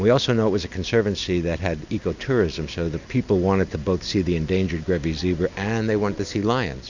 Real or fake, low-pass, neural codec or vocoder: real; 7.2 kHz; none